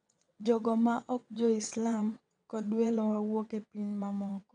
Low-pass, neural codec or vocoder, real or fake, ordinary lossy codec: 9.9 kHz; vocoder, 22.05 kHz, 80 mel bands, WaveNeXt; fake; none